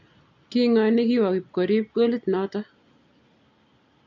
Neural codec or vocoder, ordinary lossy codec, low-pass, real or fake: none; none; 7.2 kHz; real